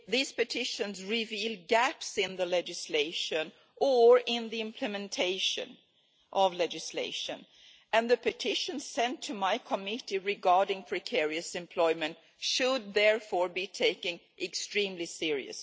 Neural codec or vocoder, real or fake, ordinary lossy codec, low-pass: none; real; none; none